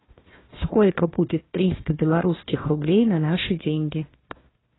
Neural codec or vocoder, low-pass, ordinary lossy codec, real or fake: codec, 16 kHz, 1 kbps, FunCodec, trained on Chinese and English, 50 frames a second; 7.2 kHz; AAC, 16 kbps; fake